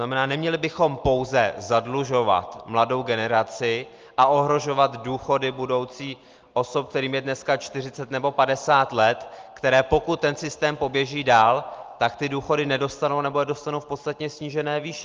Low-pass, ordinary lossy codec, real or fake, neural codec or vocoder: 7.2 kHz; Opus, 32 kbps; real; none